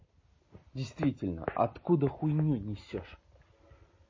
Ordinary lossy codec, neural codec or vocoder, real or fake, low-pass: MP3, 32 kbps; none; real; 7.2 kHz